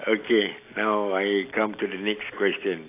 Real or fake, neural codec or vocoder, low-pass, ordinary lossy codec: real; none; 3.6 kHz; AAC, 24 kbps